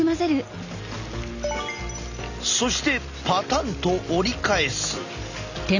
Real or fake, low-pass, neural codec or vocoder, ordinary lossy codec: real; 7.2 kHz; none; none